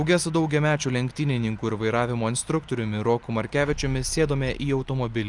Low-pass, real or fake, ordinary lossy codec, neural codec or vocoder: 10.8 kHz; real; Opus, 32 kbps; none